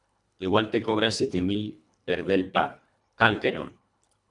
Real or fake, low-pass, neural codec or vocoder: fake; 10.8 kHz; codec, 24 kHz, 1.5 kbps, HILCodec